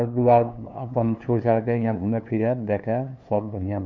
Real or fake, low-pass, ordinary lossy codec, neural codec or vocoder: fake; 7.2 kHz; none; codec, 16 kHz, 2 kbps, FunCodec, trained on LibriTTS, 25 frames a second